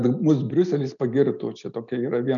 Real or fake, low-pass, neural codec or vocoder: real; 7.2 kHz; none